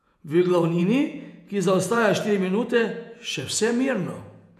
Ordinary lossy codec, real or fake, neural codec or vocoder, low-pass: none; fake; vocoder, 48 kHz, 128 mel bands, Vocos; 14.4 kHz